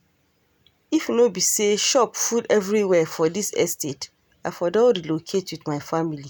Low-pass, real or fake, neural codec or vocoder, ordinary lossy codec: none; real; none; none